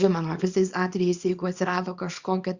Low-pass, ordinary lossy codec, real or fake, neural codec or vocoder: 7.2 kHz; Opus, 64 kbps; fake; codec, 24 kHz, 0.9 kbps, WavTokenizer, small release